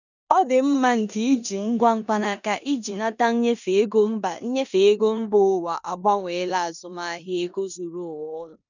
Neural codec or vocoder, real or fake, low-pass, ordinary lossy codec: codec, 16 kHz in and 24 kHz out, 0.9 kbps, LongCat-Audio-Codec, four codebook decoder; fake; 7.2 kHz; none